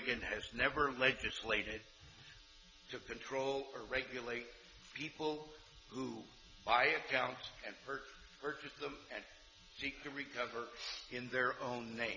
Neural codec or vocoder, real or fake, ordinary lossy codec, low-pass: none; real; Opus, 64 kbps; 7.2 kHz